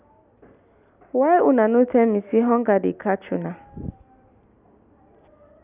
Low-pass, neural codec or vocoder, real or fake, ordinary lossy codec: 3.6 kHz; none; real; none